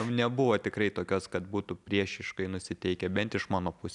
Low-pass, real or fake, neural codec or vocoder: 10.8 kHz; real; none